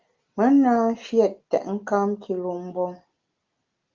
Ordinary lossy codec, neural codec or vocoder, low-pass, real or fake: Opus, 32 kbps; none; 7.2 kHz; real